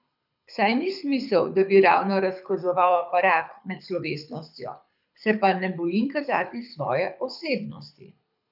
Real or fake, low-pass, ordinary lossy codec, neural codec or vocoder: fake; 5.4 kHz; none; codec, 24 kHz, 6 kbps, HILCodec